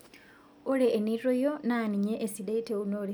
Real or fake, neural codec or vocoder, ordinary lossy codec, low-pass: real; none; none; none